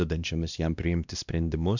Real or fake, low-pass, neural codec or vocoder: fake; 7.2 kHz; codec, 16 kHz, 1 kbps, X-Codec, WavLM features, trained on Multilingual LibriSpeech